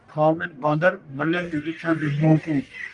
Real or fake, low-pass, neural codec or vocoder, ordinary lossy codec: fake; 10.8 kHz; codec, 44.1 kHz, 1.7 kbps, Pupu-Codec; Opus, 32 kbps